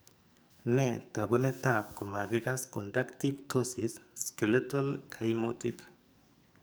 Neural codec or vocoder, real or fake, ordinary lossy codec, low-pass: codec, 44.1 kHz, 2.6 kbps, SNAC; fake; none; none